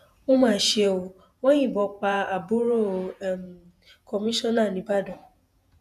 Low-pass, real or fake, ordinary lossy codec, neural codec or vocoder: 14.4 kHz; fake; none; vocoder, 48 kHz, 128 mel bands, Vocos